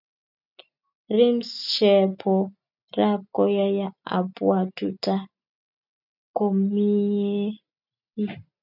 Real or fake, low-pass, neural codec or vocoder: real; 5.4 kHz; none